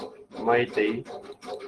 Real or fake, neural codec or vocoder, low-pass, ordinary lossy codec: real; none; 10.8 kHz; Opus, 16 kbps